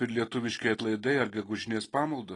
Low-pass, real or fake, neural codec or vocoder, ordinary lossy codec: 10.8 kHz; real; none; AAC, 32 kbps